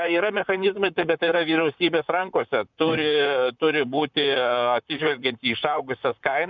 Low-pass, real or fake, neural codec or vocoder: 7.2 kHz; fake; vocoder, 44.1 kHz, 128 mel bands, Pupu-Vocoder